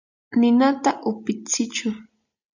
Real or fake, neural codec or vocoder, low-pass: real; none; 7.2 kHz